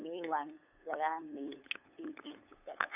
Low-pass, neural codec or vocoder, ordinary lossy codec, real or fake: 3.6 kHz; codec, 16 kHz, 8 kbps, FunCodec, trained on LibriTTS, 25 frames a second; none; fake